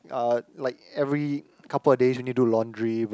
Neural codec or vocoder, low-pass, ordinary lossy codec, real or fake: none; none; none; real